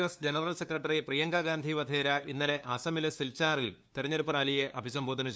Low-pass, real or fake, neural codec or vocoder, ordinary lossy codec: none; fake; codec, 16 kHz, 2 kbps, FunCodec, trained on LibriTTS, 25 frames a second; none